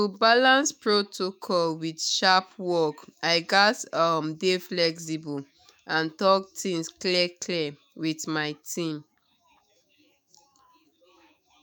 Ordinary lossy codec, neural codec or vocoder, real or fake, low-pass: none; autoencoder, 48 kHz, 128 numbers a frame, DAC-VAE, trained on Japanese speech; fake; none